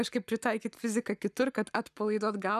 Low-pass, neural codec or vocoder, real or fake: 14.4 kHz; codec, 44.1 kHz, 7.8 kbps, Pupu-Codec; fake